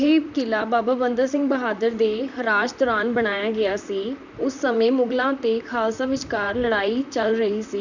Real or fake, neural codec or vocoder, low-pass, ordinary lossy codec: fake; vocoder, 44.1 kHz, 128 mel bands, Pupu-Vocoder; 7.2 kHz; none